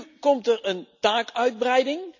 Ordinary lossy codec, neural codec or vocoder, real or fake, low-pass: none; none; real; 7.2 kHz